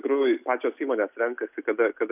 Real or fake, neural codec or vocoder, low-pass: real; none; 3.6 kHz